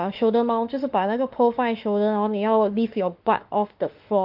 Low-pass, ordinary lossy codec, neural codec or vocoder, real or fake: 5.4 kHz; Opus, 32 kbps; autoencoder, 48 kHz, 32 numbers a frame, DAC-VAE, trained on Japanese speech; fake